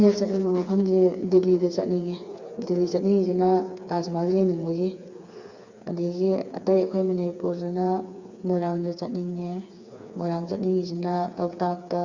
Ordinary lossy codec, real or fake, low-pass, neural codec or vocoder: Opus, 64 kbps; fake; 7.2 kHz; codec, 16 kHz, 4 kbps, FreqCodec, smaller model